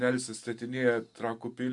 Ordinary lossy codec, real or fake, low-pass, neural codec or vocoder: MP3, 64 kbps; real; 10.8 kHz; none